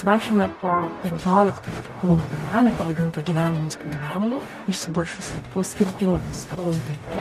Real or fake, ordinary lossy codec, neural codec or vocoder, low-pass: fake; MP3, 64 kbps; codec, 44.1 kHz, 0.9 kbps, DAC; 14.4 kHz